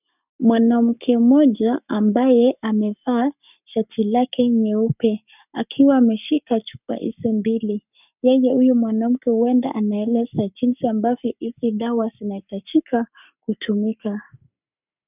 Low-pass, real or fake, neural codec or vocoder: 3.6 kHz; fake; codec, 44.1 kHz, 7.8 kbps, Pupu-Codec